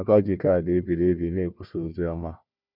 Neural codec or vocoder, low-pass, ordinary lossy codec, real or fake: codec, 16 kHz, 1 kbps, FunCodec, trained on Chinese and English, 50 frames a second; 5.4 kHz; none; fake